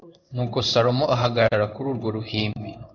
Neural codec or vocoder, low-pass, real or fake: codec, 16 kHz in and 24 kHz out, 1 kbps, XY-Tokenizer; 7.2 kHz; fake